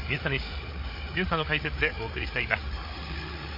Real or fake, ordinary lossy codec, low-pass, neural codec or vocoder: fake; MP3, 32 kbps; 5.4 kHz; codec, 16 kHz, 8 kbps, FreqCodec, larger model